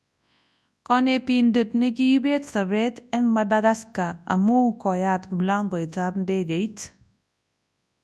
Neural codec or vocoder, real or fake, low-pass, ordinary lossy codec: codec, 24 kHz, 0.9 kbps, WavTokenizer, large speech release; fake; none; none